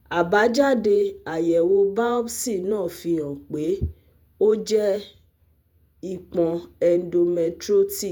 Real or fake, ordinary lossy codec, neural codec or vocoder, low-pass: fake; none; vocoder, 48 kHz, 128 mel bands, Vocos; none